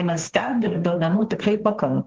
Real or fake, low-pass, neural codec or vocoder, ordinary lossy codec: fake; 7.2 kHz; codec, 16 kHz, 1.1 kbps, Voila-Tokenizer; Opus, 16 kbps